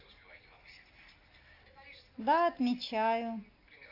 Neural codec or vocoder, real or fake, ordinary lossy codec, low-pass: none; real; AAC, 32 kbps; 5.4 kHz